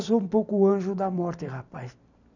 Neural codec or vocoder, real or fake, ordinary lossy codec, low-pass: vocoder, 44.1 kHz, 128 mel bands every 256 samples, BigVGAN v2; fake; none; 7.2 kHz